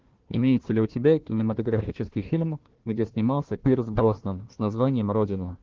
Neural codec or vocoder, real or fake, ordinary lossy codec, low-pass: codec, 16 kHz, 1 kbps, FunCodec, trained on Chinese and English, 50 frames a second; fake; Opus, 16 kbps; 7.2 kHz